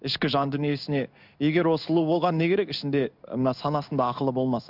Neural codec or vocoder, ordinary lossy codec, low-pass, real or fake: codec, 16 kHz in and 24 kHz out, 1 kbps, XY-Tokenizer; none; 5.4 kHz; fake